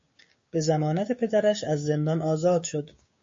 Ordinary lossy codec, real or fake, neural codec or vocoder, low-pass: MP3, 32 kbps; fake; codec, 16 kHz, 6 kbps, DAC; 7.2 kHz